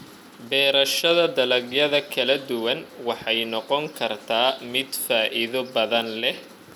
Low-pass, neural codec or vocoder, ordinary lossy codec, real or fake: 19.8 kHz; none; none; real